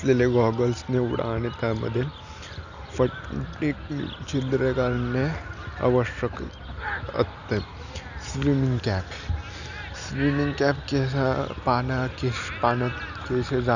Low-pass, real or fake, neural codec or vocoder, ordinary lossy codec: 7.2 kHz; real; none; none